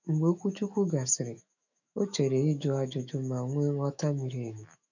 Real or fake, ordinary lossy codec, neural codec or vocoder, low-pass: fake; none; autoencoder, 48 kHz, 128 numbers a frame, DAC-VAE, trained on Japanese speech; 7.2 kHz